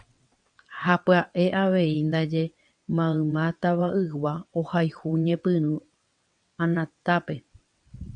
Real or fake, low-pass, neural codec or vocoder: fake; 9.9 kHz; vocoder, 22.05 kHz, 80 mel bands, WaveNeXt